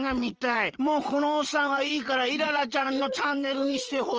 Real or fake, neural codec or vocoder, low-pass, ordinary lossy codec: fake; vocoder, 22.05 kHz, 80 mel bands, WaveNeXt; 7.2 kHz; Opus, 24 kbps